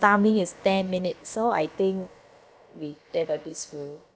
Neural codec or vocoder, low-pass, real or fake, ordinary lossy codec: codec, 16 kHz, about 1 kbps, DyCAST, with the encoder's durations; none; fake; none